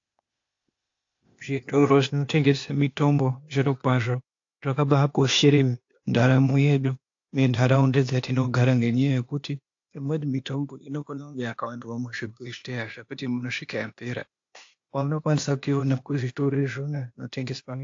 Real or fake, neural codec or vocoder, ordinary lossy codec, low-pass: fake; codec, 16 kHz, 0.8 kbps, ZipCodec; AAC, 48 kbps; 7.2 kHz